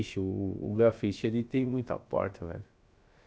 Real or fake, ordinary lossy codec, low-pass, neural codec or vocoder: fake; none; none; codec, 16 kHz, about 1 kbps, DyCAST, with the encoder's durations